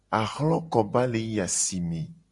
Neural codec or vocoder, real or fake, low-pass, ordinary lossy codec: none; real; 10.8 kHz; AAC, 64 kbps